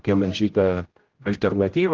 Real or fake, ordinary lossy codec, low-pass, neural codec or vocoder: fake; Opus, 16 kbps; 7.2 kHz; codec, 16 kHz, 0.5 kbps, X-Codec, HuBERT features, trained on general audio